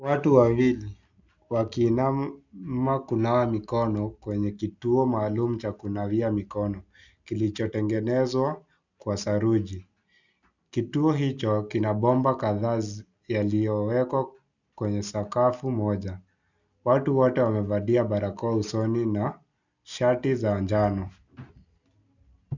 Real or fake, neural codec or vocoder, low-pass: real; none; 7.2 kHz